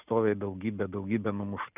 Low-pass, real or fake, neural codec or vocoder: 3.6 kHz; real; none